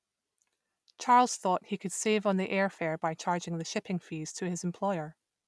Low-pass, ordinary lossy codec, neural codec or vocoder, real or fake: none; none; none; real